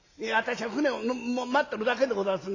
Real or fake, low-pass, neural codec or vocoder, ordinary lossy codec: real; 7.2 kHz; none; AAC, 32 kbps